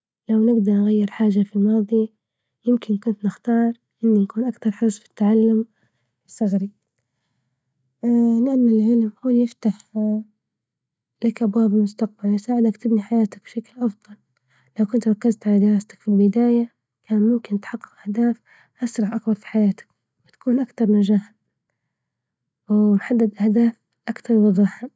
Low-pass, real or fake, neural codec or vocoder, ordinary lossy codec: none; real; none; none